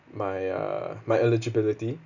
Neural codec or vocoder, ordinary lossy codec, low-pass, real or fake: none; none; 7.2 kHz; real